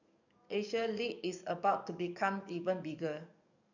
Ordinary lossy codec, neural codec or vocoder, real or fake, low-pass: none; codec, 44.1 kHz, 7.8 kbps, DAC; fake; 7.2 kHz